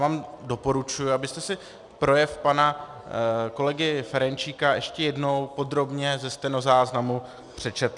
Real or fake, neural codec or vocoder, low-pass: real; none; 10.8 kHz